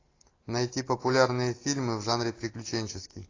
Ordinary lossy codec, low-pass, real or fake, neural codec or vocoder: AAC, 32 kbps; 7.2 kHz; real; none